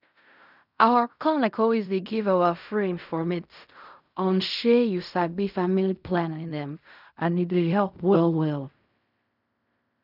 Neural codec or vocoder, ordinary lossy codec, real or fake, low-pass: codec, 16 kHz in and 24 kHz out, 0.4 kbps, LongCat-Audio-Codec, fine tuned four codebook decoder; none; fake; 5.4 kHz